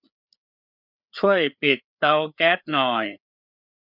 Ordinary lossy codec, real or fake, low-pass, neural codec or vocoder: none; fake; 5.4 kHz; codec, 16 kHz, 4 kbps, FreqCodec, larger model